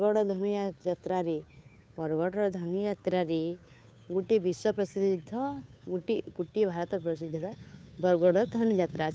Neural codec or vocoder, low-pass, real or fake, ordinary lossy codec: codec, 16 kHz, 2 kbps, FunCodec, trained on Chinese and English, 25 frames a second; none; fake; none